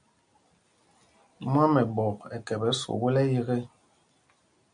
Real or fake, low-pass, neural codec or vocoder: real; 9.9 kHz; none